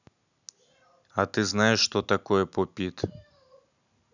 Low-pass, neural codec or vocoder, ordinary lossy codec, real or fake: 7.2 kHz; none; none; real